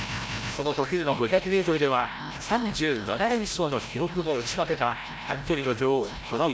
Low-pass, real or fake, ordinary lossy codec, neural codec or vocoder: none; fake; none; codec, 16 kHz, 0.5 kbps, FreqCodec, larger model